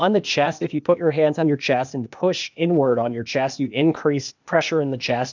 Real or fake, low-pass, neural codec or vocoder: fake; 7.2 kHz; codec, 16 kHz, 0.8 kbps, ZipCodec